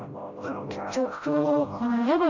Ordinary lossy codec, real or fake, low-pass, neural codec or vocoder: AAC, 48 kbps; fake; 7.2 kHz; codec, 16 kHz, 0.5 kbps, FreqCodec, smaller model